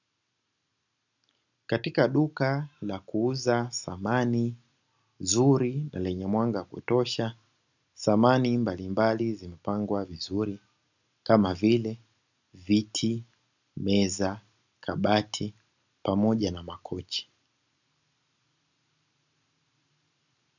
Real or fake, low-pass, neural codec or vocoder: real; 7.2 kHz; none